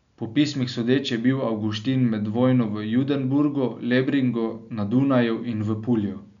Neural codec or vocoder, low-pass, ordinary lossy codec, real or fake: none; 7.2 kHz; none; real